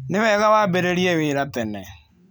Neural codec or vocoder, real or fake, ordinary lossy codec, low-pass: none; real; none; none